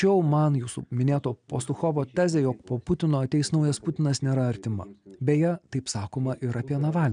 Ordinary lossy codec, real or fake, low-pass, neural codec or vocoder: MP3, 96 kbps; real; 9.9 kHz; none